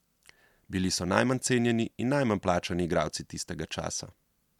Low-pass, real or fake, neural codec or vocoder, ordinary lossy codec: 19.8 kHz; real; none; MP3, 96 kbps